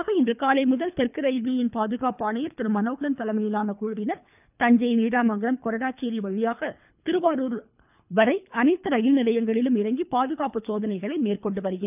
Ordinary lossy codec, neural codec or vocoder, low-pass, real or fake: none; codec, 24 kHz, 3 kbps, HILCodec; 3.6 kHz; fake